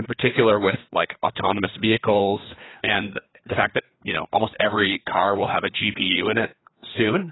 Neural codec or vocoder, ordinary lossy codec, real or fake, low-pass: codec, 16 kHz, 2 kbps, FreqCodec, larger model; AAC, 16 kbps; fake; 7.2 kHz